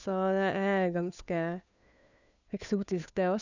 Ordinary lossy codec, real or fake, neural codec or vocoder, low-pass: none; fake; codec, 16 kHz, 2 kbps, FunCodec, trained on Chinese and English, 25 frames a second; 7.2 kHz